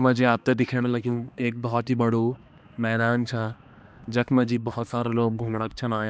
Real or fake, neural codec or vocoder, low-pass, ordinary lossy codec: fake; codec, 16 kHz, 2 kbps, X-Codec, HuBERT features, trained on balanced general audio; none; none